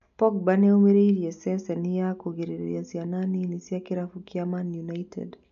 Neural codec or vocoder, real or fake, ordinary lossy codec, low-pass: none; real; MP3, 96 kbps; 7.2 kHz